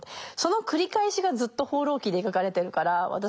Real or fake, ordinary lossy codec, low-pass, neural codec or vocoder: real; none; none; none